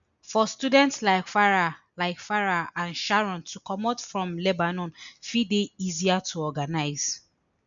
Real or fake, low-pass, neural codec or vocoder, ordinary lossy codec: real; 7.2 kHz; none; MP3, 96 kbps